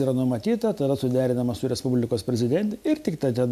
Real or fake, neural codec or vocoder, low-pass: real; none; 14.4 kHz